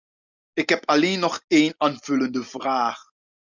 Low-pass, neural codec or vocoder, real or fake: 7.2 kHz; none; real